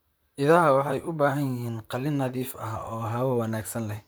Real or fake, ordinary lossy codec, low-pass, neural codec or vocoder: fake; none; none; vocoder, 44.1 kHz, 128 mel bands, Pupu-Vocoder